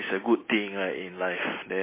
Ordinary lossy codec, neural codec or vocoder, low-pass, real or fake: MP3, 16 kbps; none; 3.6 kHz; real